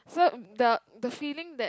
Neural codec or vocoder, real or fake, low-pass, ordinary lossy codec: none; real; none; none